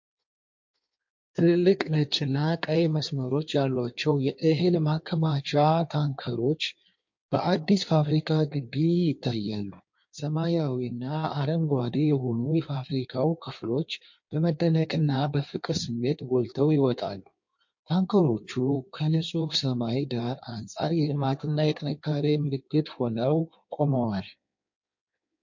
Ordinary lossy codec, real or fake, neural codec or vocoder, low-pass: MP3, 48 kbps; fake; codec, 16 kHz in and 24 kHz out, 1.1 kbps, FireRedTTS-2 codec; 7.2 kHz